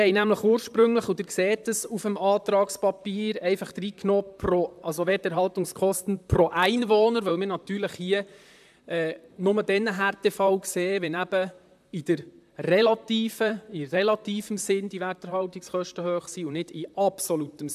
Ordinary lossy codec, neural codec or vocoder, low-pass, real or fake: AAC, 96 kbps; vocoder, 44.1 kHz, 128 mel bands, Pupu-Vocoder; 14.4 kHz; fake